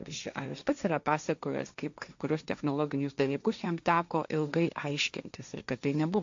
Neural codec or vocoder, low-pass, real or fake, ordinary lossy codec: codec, 16 kHz, 1.1 kbps, Voila-Tokenizer; 7.2 kHz; fake; AAC, 64 kbps